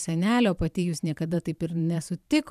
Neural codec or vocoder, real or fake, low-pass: vocoder, 44.1 kHz, 128 mel bands every 512 samples, BigVGAN v2; fake; 14.4 kHz